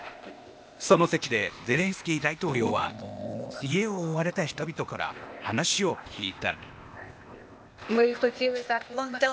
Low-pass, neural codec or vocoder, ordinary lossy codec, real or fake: none; codec, 16 kHz, 0.8 kbps, ZipCodec; none; fake